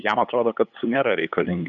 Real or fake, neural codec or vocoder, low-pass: fake; codec, 16 kHz, 8 kbps, FunCodec, trained on LibriTTS, 25 frames a second; 7.2 kHz